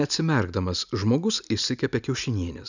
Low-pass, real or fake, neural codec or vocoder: 7.2 kHz; real; none